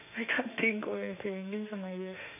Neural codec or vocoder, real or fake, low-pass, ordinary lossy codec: autoencoder, 48 kHz, 32 numbers a frame, DAC-VAE, trained on Japanese speech; fake; 3.6 kHz; none